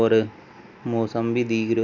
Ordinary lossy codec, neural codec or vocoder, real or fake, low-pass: none; none; real; 7.2 kHz